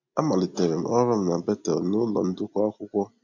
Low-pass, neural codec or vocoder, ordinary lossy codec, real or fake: 7.2 kHz; none; none; real